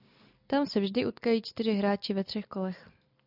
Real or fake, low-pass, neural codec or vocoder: real; 5.4 kHz; none